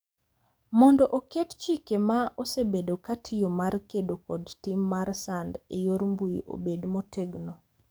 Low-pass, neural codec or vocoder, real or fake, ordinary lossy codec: none; codec, 44.1 kHz, 7.8 kbps, DAC; fake; none